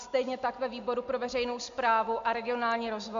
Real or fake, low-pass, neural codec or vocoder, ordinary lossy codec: real; 7.2 kHz; none; MP3, 64 kbps